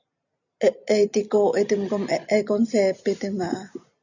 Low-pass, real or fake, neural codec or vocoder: 7.2 kHz; real; none